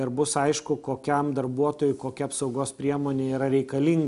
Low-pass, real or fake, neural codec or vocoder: 10.8 kHz; real; none